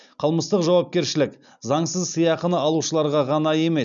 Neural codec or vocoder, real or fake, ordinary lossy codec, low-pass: none; real; none; 7.2 kHz